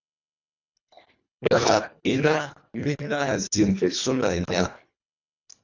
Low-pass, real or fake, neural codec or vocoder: 7.2 kHz; fake; codec, 24 kHz, 1.5 kbps, HILCodec